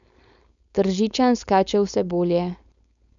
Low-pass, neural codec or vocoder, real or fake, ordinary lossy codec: 7.2 kHz; codec, 16 kHz, 4.8 kbps, FACodec; fake; MP3, 96 kbps